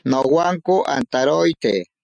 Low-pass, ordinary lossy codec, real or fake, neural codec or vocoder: 9.9 kHz; MP3, 64 kbps; real; none